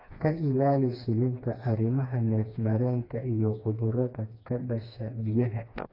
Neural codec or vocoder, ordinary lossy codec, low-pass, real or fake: codec, 16 kHz, 2 kbps, FreqCodec, smaller model; AAC, 32 kbps; 5.4 kHz; fake